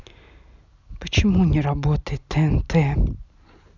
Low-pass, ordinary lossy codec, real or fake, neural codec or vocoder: 7.2 kHz; none; real; none